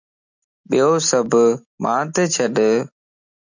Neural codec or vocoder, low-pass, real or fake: none; 7.2 kHz; real